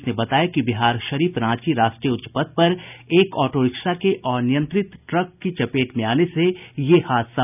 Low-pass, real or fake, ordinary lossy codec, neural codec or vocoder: 3.6 kHz; real; none; none